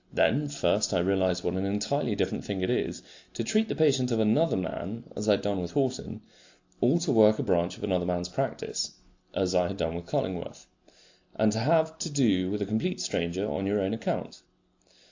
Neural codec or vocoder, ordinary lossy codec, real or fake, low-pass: none; AAC, 48 kbps; real; 7.2 kHz